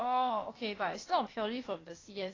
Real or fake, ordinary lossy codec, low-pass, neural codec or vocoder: fake; AAC, 32 kbps; 7.2 kHz; codec, 16 kHz, 0.8 kbps, ZipCodec